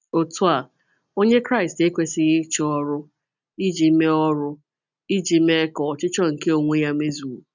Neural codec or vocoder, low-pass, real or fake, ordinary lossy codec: none; 7.2 kHz; real; none